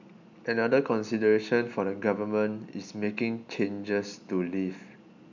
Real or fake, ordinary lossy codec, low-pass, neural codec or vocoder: real; none; 7.2 kHz; none